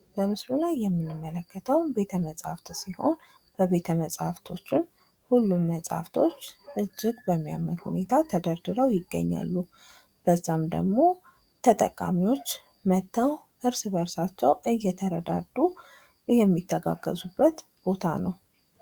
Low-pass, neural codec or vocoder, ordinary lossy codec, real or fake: 19.8 kHz; codec, 44.1 kHz, 7.8 kbps, DAC; Opus, 64 kbps; fake